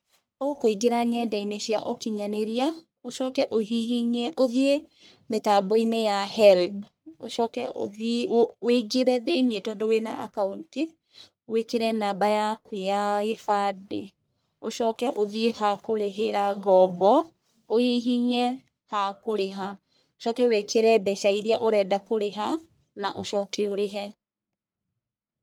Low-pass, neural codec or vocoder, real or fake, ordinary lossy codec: none; codec, 44.1 kHz, 1.7 kbps, Pupu-Codec; fake; none